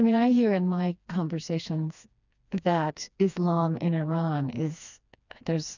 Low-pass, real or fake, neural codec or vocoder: 7.2 kHz; fake; codec, 16 kHz, 2 kbps, FreqCodec, smaller model